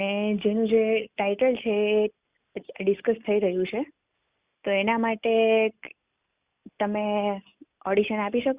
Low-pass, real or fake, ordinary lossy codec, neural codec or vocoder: 3.6 kHz; real; Opus, 64 kbps; none